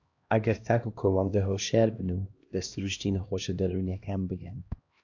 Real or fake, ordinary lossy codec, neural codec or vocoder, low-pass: fake; AAC, 48 kbps; codec, 16 kHz, 1 kbps, X-Codec, HuBERT features, trained on LibriSpeech; 7.2 kHz